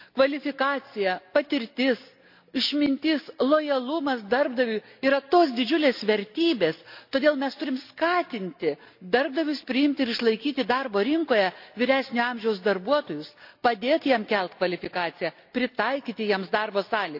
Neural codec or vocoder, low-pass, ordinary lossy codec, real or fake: none; 5.4 kHz; AAC, 48 kbps; real